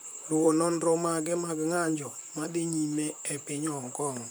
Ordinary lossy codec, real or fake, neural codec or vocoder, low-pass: none; fake; vocoder, 44.1 kHz, 128 mel bands, Pupu-Vocoder; none